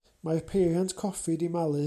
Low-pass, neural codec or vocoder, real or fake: 14.4 kHz; none; real